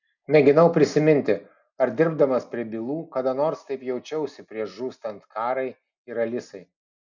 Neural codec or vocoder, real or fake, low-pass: none; real; 7.2 kHz